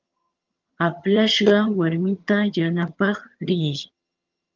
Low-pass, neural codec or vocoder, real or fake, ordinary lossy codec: 7.2 kHz; vocoder, 22.05 kHz, 80 mel bands, HiFi-GAN; fake; Opus, 32 kbps